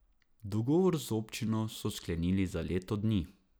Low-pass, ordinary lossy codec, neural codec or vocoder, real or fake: none; none; none; real